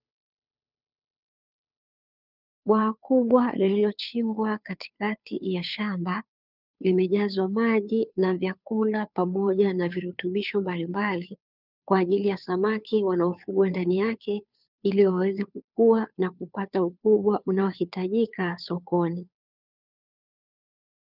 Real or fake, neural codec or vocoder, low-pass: fake; codec, 16 kHz, 2 kbps, FunCodec, trained on Chinese and English, 25 frames a second; 5.4 kHz